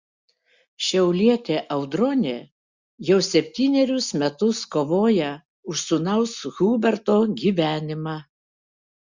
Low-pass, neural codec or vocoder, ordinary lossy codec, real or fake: 7.2 kHz; none; Opus, 64 kbps; real